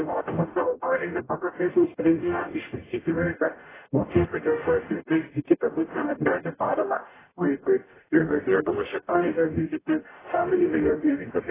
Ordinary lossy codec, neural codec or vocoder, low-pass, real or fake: AAC, 16 kbps; codec, 44.1 kHz, 0.9 kbps, DAC; 3.6 kHz; fake